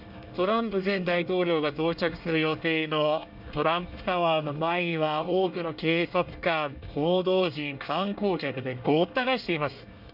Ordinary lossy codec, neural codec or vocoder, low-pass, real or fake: none; codec, 24 kHz, 1 kbps, SNAC; 5.4 kHz; fake